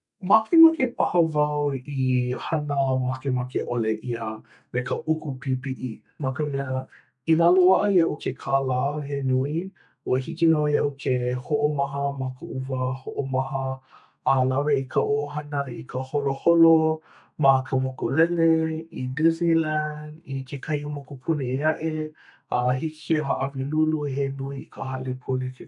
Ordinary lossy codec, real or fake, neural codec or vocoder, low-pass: none; fake; codec, 32 kHz, 1.9 kbps, SNAC; 10.8 kHz